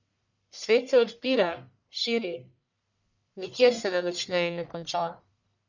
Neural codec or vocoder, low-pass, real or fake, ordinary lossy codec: codec, 44.1 kHz, 1.7 kbps, Pupu-Codec; 7.2 kHz; fake; none